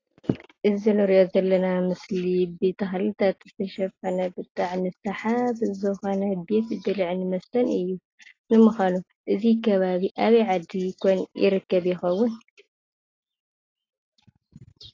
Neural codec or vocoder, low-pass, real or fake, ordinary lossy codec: none; 7.2 kHz; real; AAC, 32 kbps